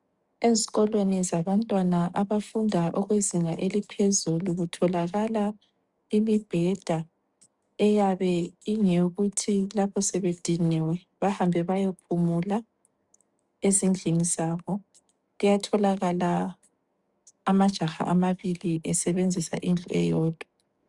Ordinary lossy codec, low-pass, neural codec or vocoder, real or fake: Opus, 64 kbps; 10.8 kHz; codec, 44.1 kHz, 7.8 kbps, DAC; fake